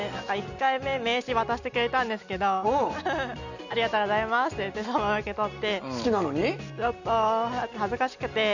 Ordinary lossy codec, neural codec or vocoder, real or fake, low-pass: none; none; real; 7.2 kHz